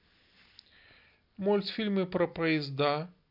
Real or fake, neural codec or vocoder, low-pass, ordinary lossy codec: real; none; 5.4 kHz; none